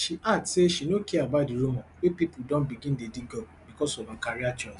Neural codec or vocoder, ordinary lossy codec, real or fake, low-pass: none; none; real; 10.8 kHz